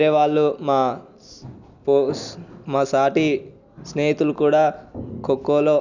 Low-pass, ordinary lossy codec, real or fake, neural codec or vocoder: 7.2 kHz; none; real; none